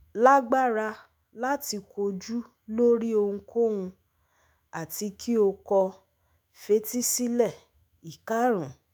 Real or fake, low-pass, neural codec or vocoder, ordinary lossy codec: fake; none; autoencoder, 48 kHz, 128 numbers a frame, DAC-VAE, trained on Japanese speech; none